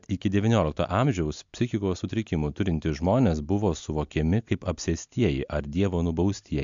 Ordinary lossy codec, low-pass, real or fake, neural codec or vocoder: MP3, 64 kbps; 7.2 kHz; real; none